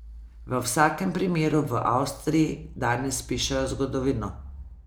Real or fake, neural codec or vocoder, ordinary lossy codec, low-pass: real; none; none; none